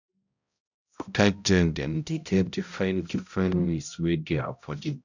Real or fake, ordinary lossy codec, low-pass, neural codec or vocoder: fake; none; 7.2 kHz; codec, 16 kHz, 0.5 kbps, X-Codec, HuBERT features, trained on balanced general audio